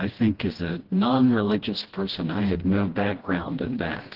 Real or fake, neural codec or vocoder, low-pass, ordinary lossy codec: fake; codec, 16 kHz, 1 kbps, FreqCodec, smaller model; 5.4 kHz; Opus, 16 kbps